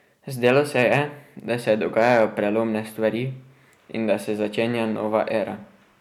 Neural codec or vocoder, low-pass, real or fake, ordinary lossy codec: none; 19.8 kHz; real; none